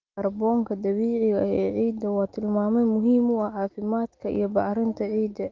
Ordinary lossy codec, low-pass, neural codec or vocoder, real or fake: Opus, 24 kbps; 7.2 kHz; none; real